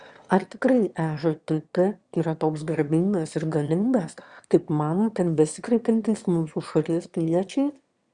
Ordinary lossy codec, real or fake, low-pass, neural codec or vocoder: Opus, 64 kbps; fake; 9.9 kHz; autoencoder, 22.05 kHz, a latent of 192 numbers a frame, VITS, trained on one speaker